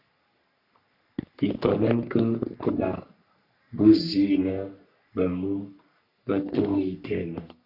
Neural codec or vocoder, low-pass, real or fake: codec, 44.1 kHz, 3.4 kbps, Pupu-Codec; 5.4 kHz; fake